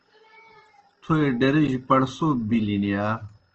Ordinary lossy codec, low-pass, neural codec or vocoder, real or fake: Opus, 16 kbps; 7.2 kHz; none; real